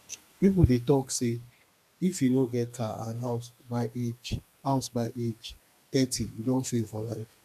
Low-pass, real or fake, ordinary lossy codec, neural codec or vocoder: 14.4 kHz; fake; none; codec, 32 kHz, 1.9 kbps, SNAC